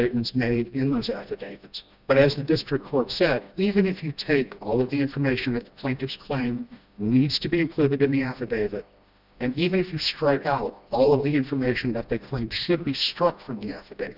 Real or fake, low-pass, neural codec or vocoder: fake; 5.4 kHz; codec, 16 kHz, 1 kbps, FreqCodec, smaller model